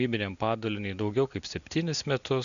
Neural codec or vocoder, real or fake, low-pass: none; real; 7.2 kHz